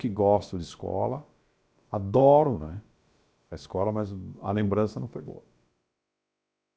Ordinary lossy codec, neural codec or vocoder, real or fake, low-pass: none; codec, 16 kHz, about 1 kbps, DyCAST, with the encoder's durations; fake; none